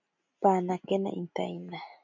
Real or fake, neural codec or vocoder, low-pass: real; none; 7.2 kHz